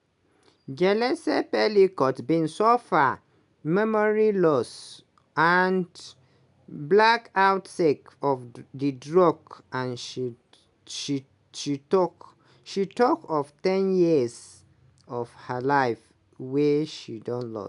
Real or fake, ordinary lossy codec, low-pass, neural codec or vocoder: real; none; 10.8 kHz; none